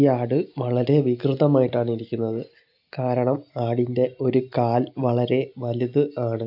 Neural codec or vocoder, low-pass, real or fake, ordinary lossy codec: none; 5.4 kHz; real; AAC, 48 kbps